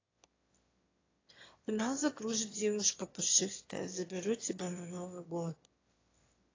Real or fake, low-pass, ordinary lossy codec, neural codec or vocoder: fake; 7.2 kHz; AAC, 32 kbps; autoencoder, 22.05 kHz, a latent of 192 numbers a frame, VITS, trained on one speaker